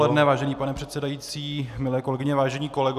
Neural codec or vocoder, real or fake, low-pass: none; real; 14.4 kHz